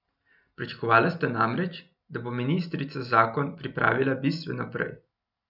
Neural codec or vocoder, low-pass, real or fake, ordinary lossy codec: none; 5.4 kHz; real; none